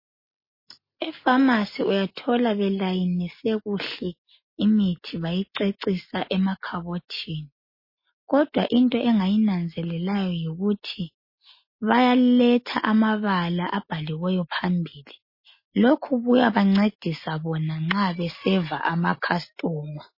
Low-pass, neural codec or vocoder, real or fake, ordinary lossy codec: 5.4 kHz; none; real; MP3, 24 kbps